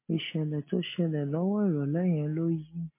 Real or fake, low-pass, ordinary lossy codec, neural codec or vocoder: real; 3.6 kHz; MP3, 24 kbps; none